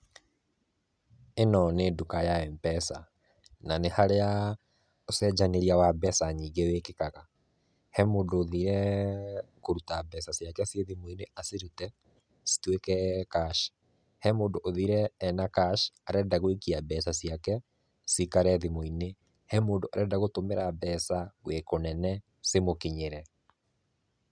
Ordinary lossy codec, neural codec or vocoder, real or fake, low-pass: none; none; real; 9.9 kHz